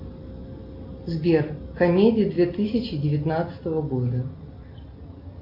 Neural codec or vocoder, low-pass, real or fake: none; 5.4 kHz; real